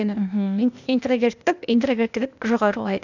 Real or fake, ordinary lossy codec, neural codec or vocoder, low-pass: fake; none; codec, 16 kHz, 0.8 kbps, ZipCodec; 7.2 kHz